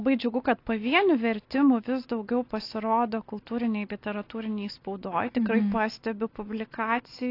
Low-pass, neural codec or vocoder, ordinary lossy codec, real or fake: 5.4 kHz; none; AAC, 32 kbps; real